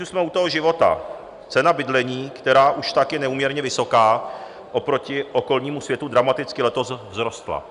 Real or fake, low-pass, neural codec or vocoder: real; 10.8 kHz; none